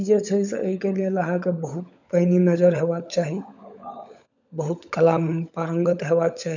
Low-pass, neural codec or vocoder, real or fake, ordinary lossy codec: 7.2 kHz; codec, 16 kHz, 16 kbps, FunCodec, trained on LibriTTS, 50 frames a second; fake; none